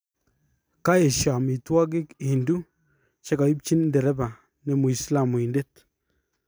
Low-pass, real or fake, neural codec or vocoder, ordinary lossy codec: none; real; none; none